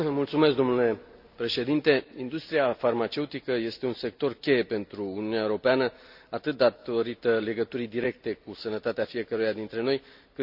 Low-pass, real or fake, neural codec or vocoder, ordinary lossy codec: 5.4 kHz; real; none; none